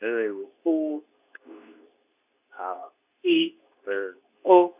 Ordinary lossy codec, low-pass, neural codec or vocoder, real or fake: none; 3.6 kHz; codec, 24 kHz, 0.9 kbps, WavTokenizer, medium speech release version 2; fake